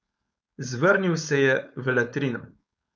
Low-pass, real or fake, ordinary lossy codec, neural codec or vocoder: none; fake; none; codec, 16 kHz, 4.8 kbps, FACodec